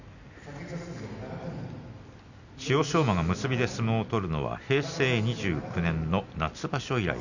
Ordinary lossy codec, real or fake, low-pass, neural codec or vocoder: none; real; 7.2 kHz; none